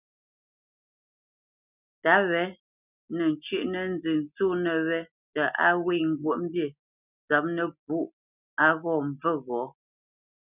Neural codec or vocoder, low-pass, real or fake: none; 3.6 kHz; real